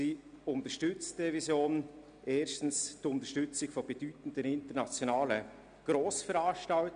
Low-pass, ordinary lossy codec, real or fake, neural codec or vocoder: 9.9 kHz; none; real; none